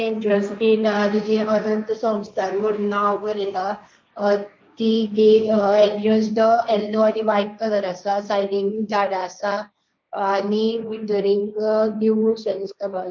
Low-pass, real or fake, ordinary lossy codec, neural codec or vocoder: none; fake; none; codec, 16 kHz, 1.1 kbps, Voila-Tokenizer